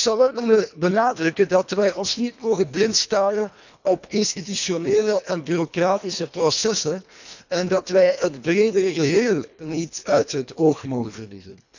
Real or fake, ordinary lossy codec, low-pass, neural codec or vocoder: fake; none; 7.2 kHz; codec, 24 kHz, 1.5 kbps, HILCodec